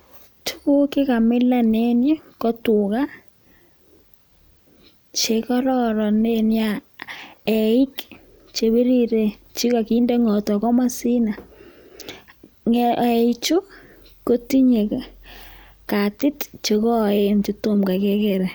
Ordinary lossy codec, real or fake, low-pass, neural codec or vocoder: none; real; none; none